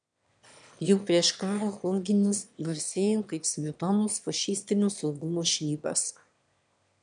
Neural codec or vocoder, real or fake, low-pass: autoencoder, 22.05 kHz, a latent of 192 numbers a frame, VITS, trained on one speaker; fake; 9.9 kHz